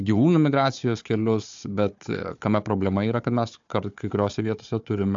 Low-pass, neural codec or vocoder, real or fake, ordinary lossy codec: 7.2 kHz; codec, 16 kHz, 4 kbps, FunCodec, trained on Chinese and English, 50 frames a second; fake; AAC, 64 kbps